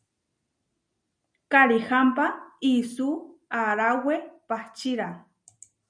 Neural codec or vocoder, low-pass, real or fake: none; 9.9 kHz; real